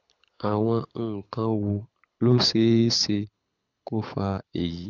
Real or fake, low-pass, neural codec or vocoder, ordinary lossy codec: fake; 7.2 kHz; codec, 24 kHz, 6 kbps, HILCodec; none